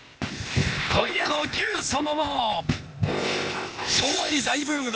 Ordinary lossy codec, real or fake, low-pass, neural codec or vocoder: none; fake; none; codec, 16 kHz, 0.8 kbps, ZipCodec